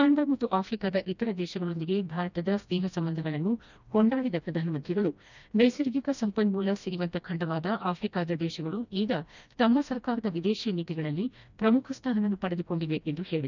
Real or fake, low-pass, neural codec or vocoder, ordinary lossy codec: fake; 7.2 kHz; codec, 16 kHz, 1 kbps, FreqCodec, smaller model; none